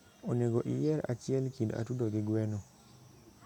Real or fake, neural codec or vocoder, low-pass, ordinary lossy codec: real; none; 19.8 kHz; none